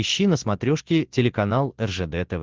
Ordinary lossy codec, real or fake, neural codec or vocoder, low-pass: Opus, 16 kbps; real; none; 7.2 kHz